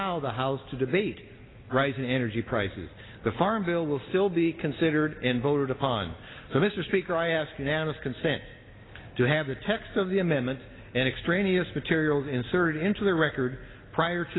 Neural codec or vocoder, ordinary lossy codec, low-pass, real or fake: none; AAC, 16 kbps; 7.2 kHz; real